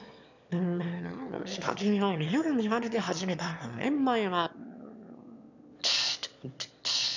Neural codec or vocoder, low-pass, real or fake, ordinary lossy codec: autoencoder, 22.05 kHz, a latent of 192 numbers a frame, VITS, trained on one speaker; 7.2 kHz; fake; none